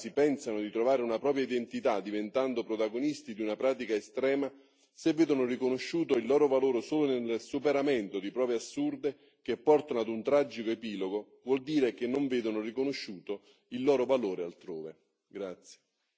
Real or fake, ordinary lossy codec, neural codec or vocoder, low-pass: real; none; none; none